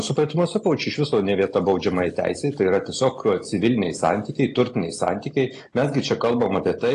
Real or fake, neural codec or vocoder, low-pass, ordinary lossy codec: real; none; 10.8 kHz; AAC, 48 kbps